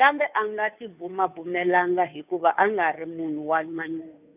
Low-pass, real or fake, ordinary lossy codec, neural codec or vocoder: 3.6 kHz; fake; none; codec, 16 kHz, 2 kbps, FunCodec, trained on Chinese and English, 25 frames a second